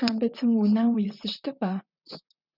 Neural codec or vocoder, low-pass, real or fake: vocoder, 44.1 kHz, 128 mel bands, Pupu-Vocoder; 5.4 kHz; fake